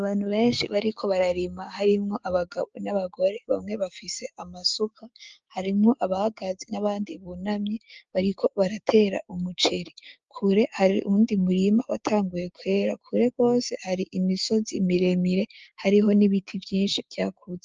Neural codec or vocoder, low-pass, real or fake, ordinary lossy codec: codec, 16 kHz, 6 kbps, DAC; 7.2 kHz; fake; Opus, 24 kbps